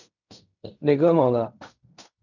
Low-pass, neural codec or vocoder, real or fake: 7.2 kHz; codec, 16 kHz in and 24 kHz out, 0.4 kbps, LongCat-Audio-Codec, fine tuned four codebook decoder; fake